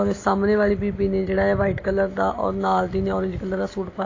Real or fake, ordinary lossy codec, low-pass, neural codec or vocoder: real; AAC, 32 kbps; 7.2 kHz; none